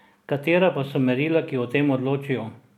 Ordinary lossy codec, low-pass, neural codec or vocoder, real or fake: none; 19.8 kHz; none; real